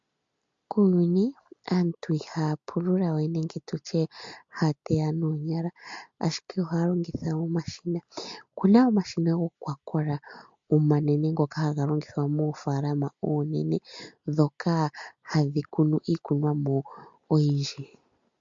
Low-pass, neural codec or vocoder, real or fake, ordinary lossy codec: 7.2 kHz; none; real; MP3, 48 kbps